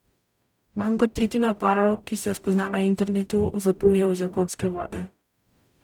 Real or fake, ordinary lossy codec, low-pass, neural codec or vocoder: fake; none; 19.8 kHz; codec, 44.1 kHz, 0.9 kbps, DAC